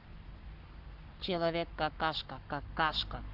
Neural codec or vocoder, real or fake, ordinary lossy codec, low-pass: codec, 44.1 kHz, 7.8 kbps, Pupu-Codec; fake; Opus, 64 kbps; 5.4 kHz